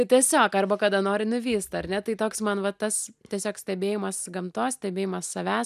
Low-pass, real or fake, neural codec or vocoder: 14.4 kHz; real; none